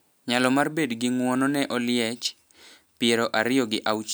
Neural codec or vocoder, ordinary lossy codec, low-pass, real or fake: none; none; none; real